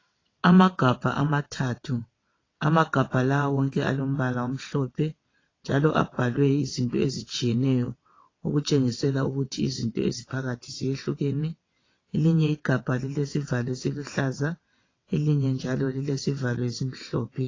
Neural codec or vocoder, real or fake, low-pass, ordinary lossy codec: vocoder, 22.05 kHz, 80 mel bands, WaveNeXt; fake; 7.2 kHz; AAC, 32 kbps